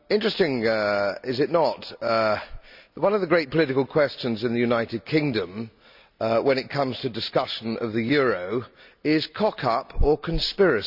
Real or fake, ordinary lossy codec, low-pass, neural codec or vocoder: real; none; 5.4 kHz; none